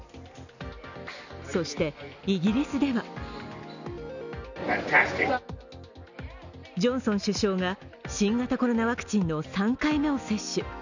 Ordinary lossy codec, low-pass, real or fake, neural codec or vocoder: none; 7.2 kHz; real; none